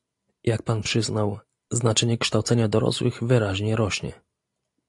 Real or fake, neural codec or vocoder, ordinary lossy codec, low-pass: real; none; AAC, 64 kbps; 10.8 kHz